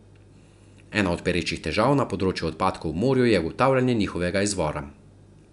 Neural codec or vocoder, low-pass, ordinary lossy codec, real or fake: none; 10.8 kHz; none; real